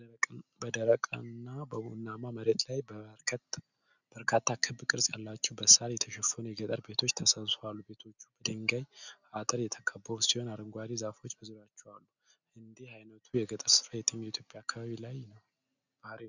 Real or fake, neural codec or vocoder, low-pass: real; none; 7.2 kHz